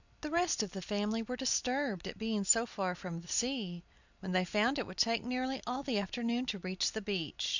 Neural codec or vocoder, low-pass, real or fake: vocoder, 44.1 kHz, 128 mel bands every 256 samples, BigVGAN v2; 7.2 kHz; fake